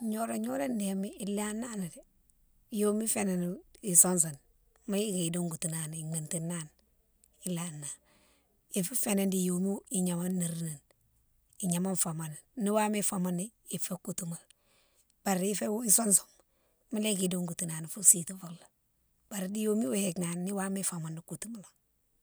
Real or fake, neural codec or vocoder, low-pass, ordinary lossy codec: real; none; none; none